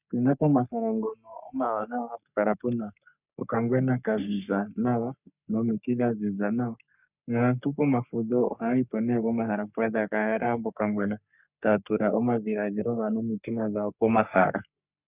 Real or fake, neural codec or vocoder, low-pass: fake; codec, 44.1 kHz, 3.4 kbps, Pupu-Codec; 3.6 kHz